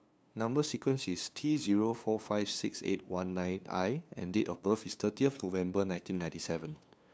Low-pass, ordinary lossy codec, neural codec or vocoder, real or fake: none; none; codec, 16 kHz, 2 kbps, FunCodec, trained on LibriTTS, 25 frames a second; fake